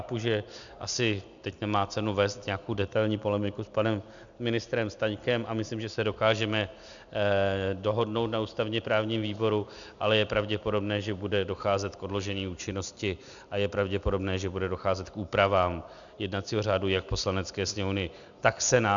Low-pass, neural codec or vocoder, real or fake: 7.2 kHz; none; real